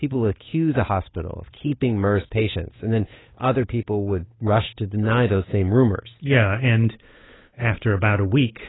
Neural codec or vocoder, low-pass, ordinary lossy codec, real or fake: codec, 16 kHz, 8 kbps, FunCodec, trained on Chinese and English, 25 frames a second; 7.2 kHz; AAC, 16 kbps; fake